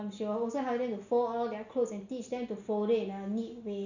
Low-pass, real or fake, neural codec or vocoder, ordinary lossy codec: 7.2 kHz; real; none; none